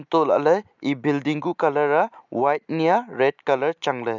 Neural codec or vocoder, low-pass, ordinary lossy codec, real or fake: none; 7.2 kHz; none; real